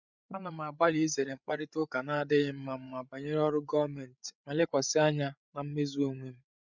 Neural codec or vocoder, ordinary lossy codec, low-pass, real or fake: codec, 16 kHz, 8 kbps, FreqCodec, larger model; none; 7.2 kHz; fake